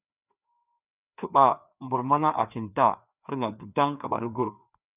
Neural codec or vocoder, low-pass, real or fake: codec, 16 kHz, 2 kbps, FreqCodec, larger model; 3.6 kHz; fake